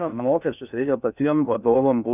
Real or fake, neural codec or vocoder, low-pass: fake; codec, 16 kHz in and 24 kHz out, 0.6 kbps, FocalCodec, streaming, 2048 codes; 3.6 kHz